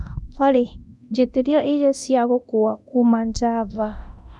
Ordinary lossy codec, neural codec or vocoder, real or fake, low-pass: none; codec, 24 kHz, 0.9 kbps, DualCodec; fake; none